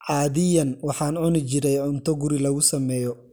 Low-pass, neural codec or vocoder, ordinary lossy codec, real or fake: none; none; none; real